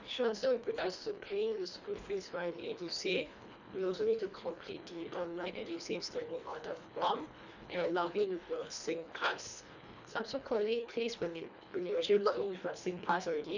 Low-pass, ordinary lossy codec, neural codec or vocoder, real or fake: 7.2 kHz; none; codec, 24 kHz, 1.5 kbps, HILCodec; fake